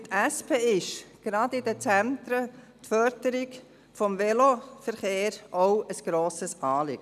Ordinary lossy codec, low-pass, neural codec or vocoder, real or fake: none; 14.4 kHz; none; real